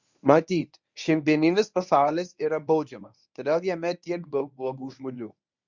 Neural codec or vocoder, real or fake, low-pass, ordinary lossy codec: codec, 24 kHz, 0.9 kbps, WavTokenizer, medium speech release version 1; fake; 7.2 kHz; Opus, 64 kbps